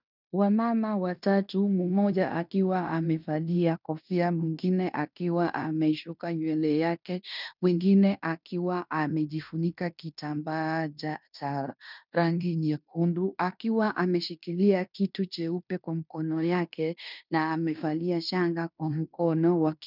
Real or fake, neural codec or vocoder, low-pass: fake; codec, 16 kHz in and 24 kHz out, 0.9 kbps, LongCat-Audio-Codec, fine tuned four codebook decoder; 5.4 kHz